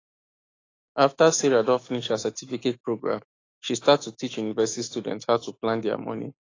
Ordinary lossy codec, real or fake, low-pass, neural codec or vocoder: AAC, 32 kbps; fake; 7.2 kHz; autoencoder, 48 kHz, 128 numbers a frame, DAC-VAE, trained on Japanese speech